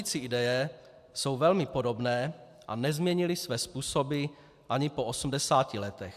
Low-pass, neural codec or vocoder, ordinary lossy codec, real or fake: 14.4 kHz; none; AAC, 96 kbps; real